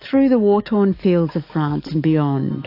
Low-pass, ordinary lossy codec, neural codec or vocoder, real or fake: 5.4 kHz; AAC, 32 kbps; codec, 44.1 kHz, 7.8 kbps, DAC; fake